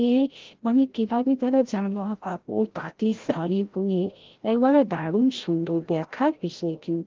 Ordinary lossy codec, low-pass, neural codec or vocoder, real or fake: Opus, 16 kbps; 7.2 kHz; codec, 16 kHz, 0.5 kbps, FreqCodec, larger model; fake